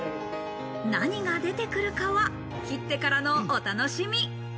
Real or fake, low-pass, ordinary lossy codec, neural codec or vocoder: real; none; none; none